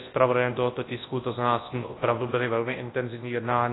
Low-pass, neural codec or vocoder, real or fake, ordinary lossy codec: 7.2 kHz; codec, 24 kHz, 0.9 kbps, WavTokenizer, large speech release; fake; AAC, 16 kbps